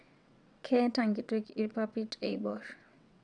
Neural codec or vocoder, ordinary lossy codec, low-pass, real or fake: vocoder, 22.05 kHz, 80 mel bands, WaveNeXt; none; 9.9 kHz; fake